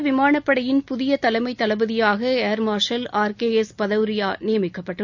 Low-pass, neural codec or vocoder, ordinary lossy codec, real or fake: 7.2 kHz; none; none; real